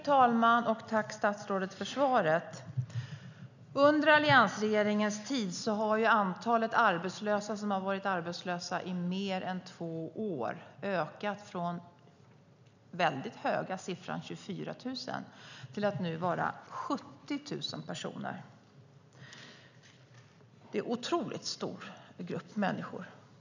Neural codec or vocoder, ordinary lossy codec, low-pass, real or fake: none; none; 7.2 kHz; real